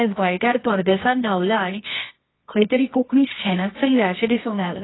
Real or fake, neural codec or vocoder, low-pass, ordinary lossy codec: fake; codec, 24 kHz, 0.9 kbps, WavTokenizer, medium music audio release; 7.2 kHz; AAC, 16 kbps